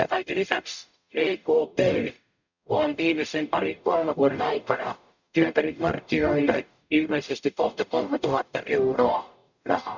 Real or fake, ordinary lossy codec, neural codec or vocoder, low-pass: fake; none; codec, 44.1 kHz, 0.9 kbps, DAC; 7.2 kHz